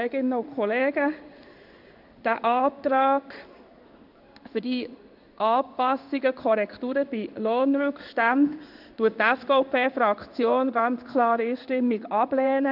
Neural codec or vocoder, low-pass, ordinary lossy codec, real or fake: codec, 16 kHz in and 24 kHz out, 1 kbps, XY-Tokenizer; 5.4 kHz; none; fake